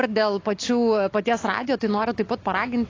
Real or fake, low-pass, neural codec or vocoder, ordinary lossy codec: real; 7.2 kHz; none; AAC, 32 kbps